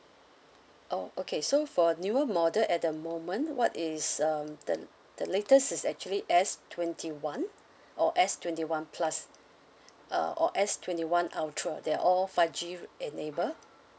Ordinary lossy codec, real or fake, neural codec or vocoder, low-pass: none; real; none; none